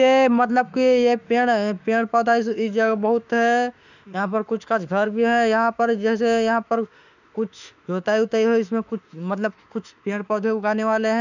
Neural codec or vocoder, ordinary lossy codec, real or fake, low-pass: autoencoder, 48 kHz, 32 numbers a frame, DAC-VAE, trained on Japanese speech; none; fake; 7.2 kHz